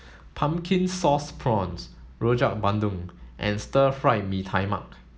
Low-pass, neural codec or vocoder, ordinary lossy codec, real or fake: none; none; none; real